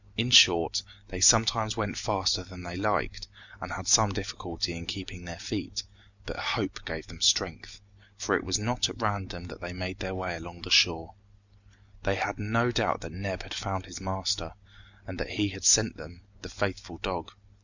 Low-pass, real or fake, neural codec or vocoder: 7.2 kHz; real; none